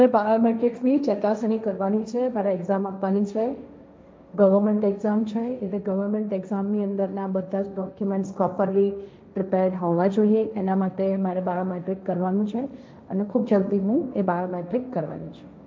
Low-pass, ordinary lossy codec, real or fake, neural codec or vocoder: none; none; fake; codec, 16 kHz, 1.1 kbps, Voila-Tokenizer